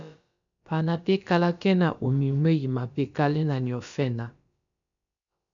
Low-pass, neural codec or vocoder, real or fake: 7.2 kHz; codec, 16 kHz, about 1 kbps, DyCAST, with the encoder's durations; fake